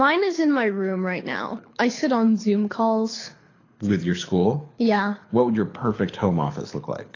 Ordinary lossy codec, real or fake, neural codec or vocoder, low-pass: AAC, 32 kbps; fake; codec, 24 kHz, 6 kbps, HILCodec; 7.2 kHz